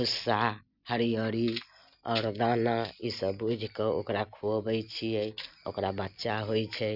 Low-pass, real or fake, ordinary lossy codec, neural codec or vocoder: 5.4 kHz; real; none; none